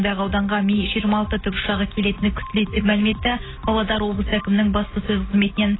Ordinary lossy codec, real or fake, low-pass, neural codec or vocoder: AAC, 16 kbps; real; 7.2 kHz; none